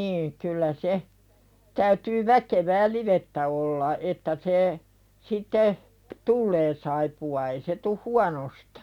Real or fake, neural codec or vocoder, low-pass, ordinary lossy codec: real; none; 19.8 kHz; none